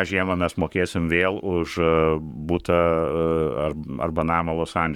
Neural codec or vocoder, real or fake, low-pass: codec, 44.1 kHz, 7.8 kbps, Pupu-Codec; fake; 19.8 kHz